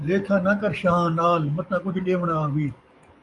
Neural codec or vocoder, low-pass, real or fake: codec, 44.1 kHz, 7.8 kbps, DAC; 10.8 kHz; fake